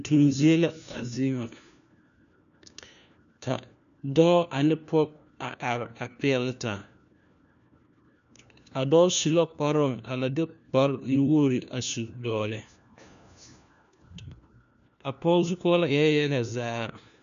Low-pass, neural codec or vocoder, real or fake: 7.2 kHz; codec, 16 kHz, 1 kbps, FunCodec, trained on LibriTTS, 50 frames a second; fake